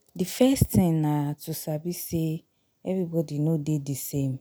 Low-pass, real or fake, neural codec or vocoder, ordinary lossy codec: none; real; none; none